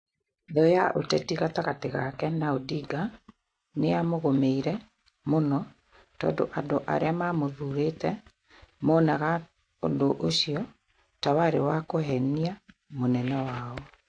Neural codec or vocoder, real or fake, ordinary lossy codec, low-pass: none; real; AAC, 48 kbps; 9.9 kHz